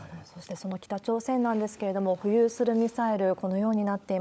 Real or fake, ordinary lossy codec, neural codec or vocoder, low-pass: fake; none; codec, 16 kHz, 16 kbps, FunCodec, trained on LibriTTS, 50 frames a second; none